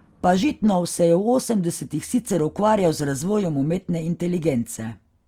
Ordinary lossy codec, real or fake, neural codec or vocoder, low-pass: Opus, 24 kbps; real; none; 19.8 kHz